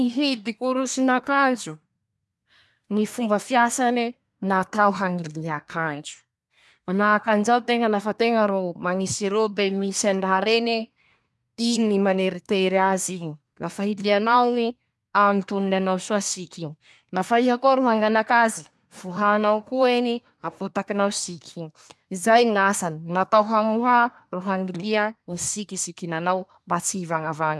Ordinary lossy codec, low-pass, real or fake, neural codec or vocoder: none; none; fake; codec, 24 kHz, 1 kbps, SNAC